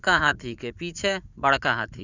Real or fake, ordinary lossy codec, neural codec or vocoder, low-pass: fake; none; vocoder, 44.1 kHz, 80 mel bands, Vocos; 7.2 kHz